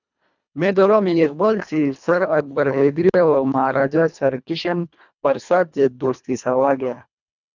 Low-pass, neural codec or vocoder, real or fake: 7.2 kHz; codec, 24 kHz, 1.5 kbps, HILCodec; fake